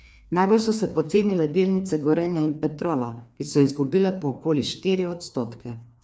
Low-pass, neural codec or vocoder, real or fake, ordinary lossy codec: none; codec, 16 kHz, 2 kbps, FreqCodec, larger model; fake; none